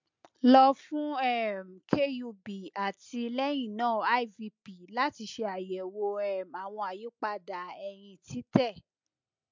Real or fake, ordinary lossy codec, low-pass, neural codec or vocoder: real; MP3, 64 kbps; 7.2 kHz; none